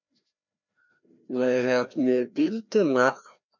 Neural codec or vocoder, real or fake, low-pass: codec, 16 kHz, 1 kbps, FreqCodec, larger model; fake; 7.2 kHz